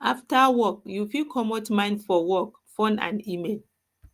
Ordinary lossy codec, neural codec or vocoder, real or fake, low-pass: Opus, 32 kbps; none; real; 14.4 kHz